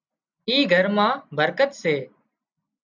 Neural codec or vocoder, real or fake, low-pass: none; real; 7.2 kHz